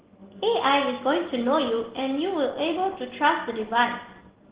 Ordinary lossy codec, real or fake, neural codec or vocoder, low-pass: Opus, 16 kbps; real; none; 3.6 kHz